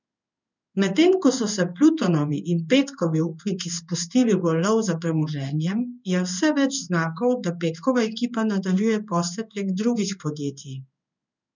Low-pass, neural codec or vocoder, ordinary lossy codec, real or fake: 7.2 kHz; codec, 16 kHz in and 24 kHz out, 1 kbps, XY-Tokenizer; none; fake